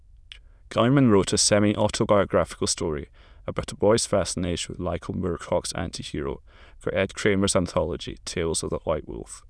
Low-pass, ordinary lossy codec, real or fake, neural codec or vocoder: none; none; fake; autoencoder, 22.05 kHz, a latent of 192 numbers a frame, VITS, trained on many speakers